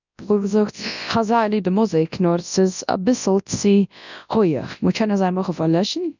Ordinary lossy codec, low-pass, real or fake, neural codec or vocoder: none; 7.2 kHz; fake; codec, 24 kHz, 0.9 kbps, WavTokenizer, large speech release